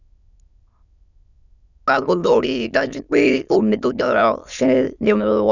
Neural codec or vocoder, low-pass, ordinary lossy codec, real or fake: autoencoder, 22.05 kHz, a latent of 192 numbers a frame, VITS, trained on many speakers; 7.2 kHz; none; fake